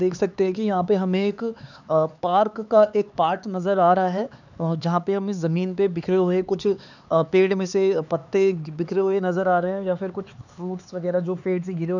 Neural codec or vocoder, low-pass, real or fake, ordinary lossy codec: codec, 16 kHz, 4 kbps, X-Codec, HuBERT features, trained on LibriSpeech; 7.2 kHz; fake; none